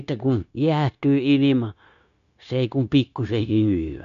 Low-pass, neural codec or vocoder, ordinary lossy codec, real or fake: 7.2 kHz; codec, 16 kHz, 0.9 kbps, LongCat-Audio-Codec; none; fake